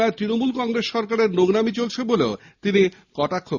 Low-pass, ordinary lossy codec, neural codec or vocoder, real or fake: 7.2 kHz; Opus, 64 kbps; none; real